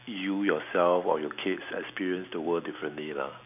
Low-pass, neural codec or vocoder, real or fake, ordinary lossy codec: 3.6 kHz; none; real; none